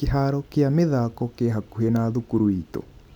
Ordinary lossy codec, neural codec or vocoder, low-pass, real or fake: none; none; 19.8 kHz; real